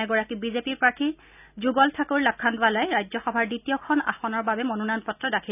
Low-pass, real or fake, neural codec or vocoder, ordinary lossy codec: 3.6 kHz; real; none; none